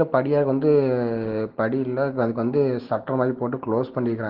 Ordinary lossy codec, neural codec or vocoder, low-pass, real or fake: Opus, 16 kbps; none; 5.4 kHz; real